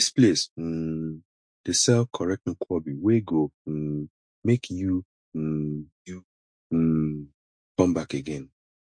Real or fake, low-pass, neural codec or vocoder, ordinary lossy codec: fake; 9.9 kHz; autoencoder, 48 kHz, 128 numbers a frame, DAC-VAE, trained on Japanese speech; MP3, 48 kbps